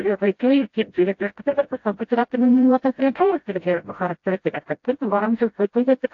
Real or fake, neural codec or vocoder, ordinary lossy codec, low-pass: fake; codec, 16 kHz, 0.5 kbps, FreqCodec, smaller model; AAC, 48 kbps; 7.2 kHz